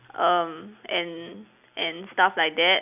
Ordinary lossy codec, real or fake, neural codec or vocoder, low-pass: none; real; none; 3.6 kHz